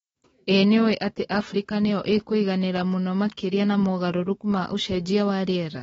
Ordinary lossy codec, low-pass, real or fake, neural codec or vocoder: AAC, 24 kbps; 19.8 kHz; fake; autoencoder, 48 kHz, 128 numbers a frame, DAC-VAE, trained on Japanese speech